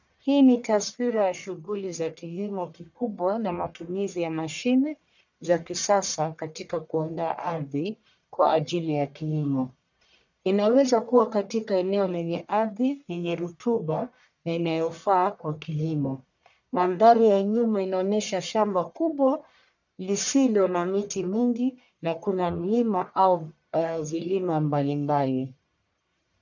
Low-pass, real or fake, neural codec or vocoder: 7.2 kHz; fake; codec, 44.1 kHz, 1.7 kbps, Pupu-Codec